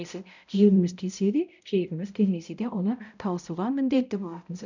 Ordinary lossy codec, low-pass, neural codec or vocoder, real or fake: none; 7.2 kHz; codec, 16 kHz, 0.5 kbps, X-Codec, HuBERT features, trained on balanced general audio; fake